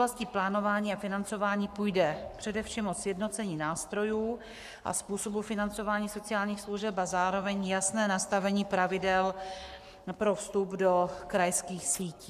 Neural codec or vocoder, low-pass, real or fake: codec, 44.1 kHz, 7.8 kbps, Pupu-Codec; 14.4 kHz; fake